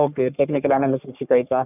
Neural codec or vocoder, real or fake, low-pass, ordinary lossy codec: codec, 44.1 kHz, 3.4 kbps, Pupu-Codec; fake; 3.6 kHz; none